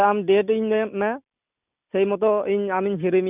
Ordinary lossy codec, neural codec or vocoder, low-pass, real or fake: none; none; 3.6 kHz; real